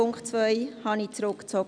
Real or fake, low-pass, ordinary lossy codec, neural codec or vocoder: real; 9.9 kHz; none; none